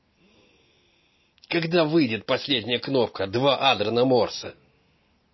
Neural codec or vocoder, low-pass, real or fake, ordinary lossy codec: none; 7.2 kHz; real; MP3, 24 kbps